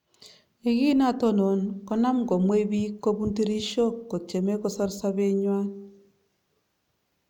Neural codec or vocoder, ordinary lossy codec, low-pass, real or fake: none; none; 19.8 kHz; real